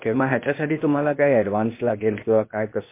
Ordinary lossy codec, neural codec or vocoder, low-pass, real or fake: MP3, 24 kbps; codec, 16 kHz, 0.8 kbps, ZipCodec; 3.6 kHz; fake